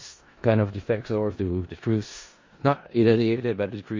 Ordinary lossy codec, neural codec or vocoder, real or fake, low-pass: MP3, 32 kbps; codec, 16 kHz in and 24 kHz out, 0.4 kbps, LongCat-Audio-Codec, four codebook decoder; fake; 7.2 kHz